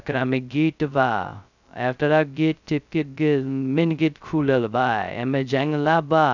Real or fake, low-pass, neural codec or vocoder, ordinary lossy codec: fake; 7.2 kHz; codec, 16 kHz, 0.2 kbps, FocalCodec; none